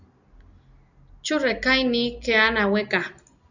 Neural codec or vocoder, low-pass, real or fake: none; 7.2 kHz; real